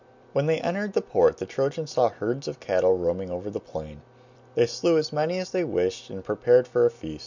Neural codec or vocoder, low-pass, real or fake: none; 7.2 kHz; real